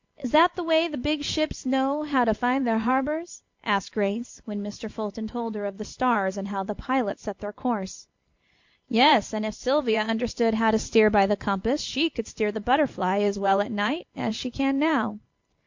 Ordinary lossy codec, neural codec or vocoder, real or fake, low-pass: MP3, 48 kbps; vocoder, 44.1 kHz, 128 mel bands every 512 samples, BigVGAN v2; fake; 7.2 kHz